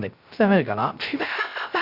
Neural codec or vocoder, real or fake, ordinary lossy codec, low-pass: codec, 16 kHz, 0.3 kbps, FocalCodec; fake; Opus, 64 kbps; 5.4 kHz